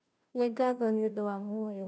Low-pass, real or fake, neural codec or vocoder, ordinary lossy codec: none; fake; codec, 16 kHz, 0.5 kbps, FunCodec, trained on Chinese and English, 25 frames a second; none